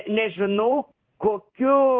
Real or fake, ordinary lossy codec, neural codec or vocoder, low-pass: fake; Opus, 32 kbps; codec, 16 kHz in and 24 kHz out, 1 kbps, XY-Tokenizer; 7.2 kHz